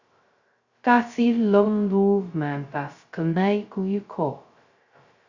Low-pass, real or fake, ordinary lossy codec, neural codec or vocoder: 7.2 kHz; fake; Opus, 64 kbps; codec, 16 kHz, 0.2 kbps, FocalCodec